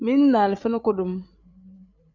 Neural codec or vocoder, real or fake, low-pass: codec, 16 kHz, 8 kbps, FreqCodec, larger model; fake; 7.2 kHz